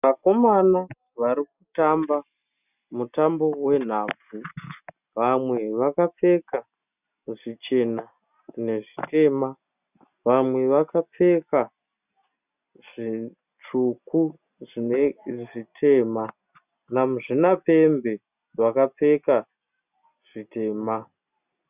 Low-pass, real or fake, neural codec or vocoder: 3.6 kHz; real; none